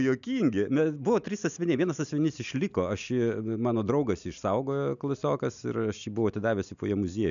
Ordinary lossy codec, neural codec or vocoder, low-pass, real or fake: MP3, 96 kbps; none; 7.2 kHz; real